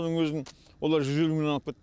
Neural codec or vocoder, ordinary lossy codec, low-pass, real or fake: none; none; none; real